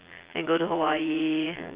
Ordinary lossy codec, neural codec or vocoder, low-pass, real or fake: Opus, 32 kbps; vocoder, 22.05 kHz, 80 mel bands, Vocos; 3.6 kHz; fake